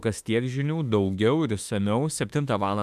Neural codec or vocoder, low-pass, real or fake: autoencoder, 48 kHz, 32 numbers a frame, DAC-VAE, trained on Japanese speech; 14.4 kHz; fake